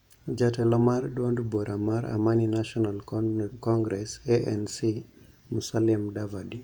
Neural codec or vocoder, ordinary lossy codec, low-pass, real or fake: vocoder, 48 kHz, 128 mel bands, Vocos; none; 19.8 kHz; fake